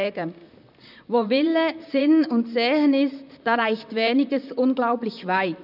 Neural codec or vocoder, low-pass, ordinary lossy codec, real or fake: vocoder, 44.1 kHz, 128 mel bands, Pupu-Vocoder; 5.4 kHz; none; fake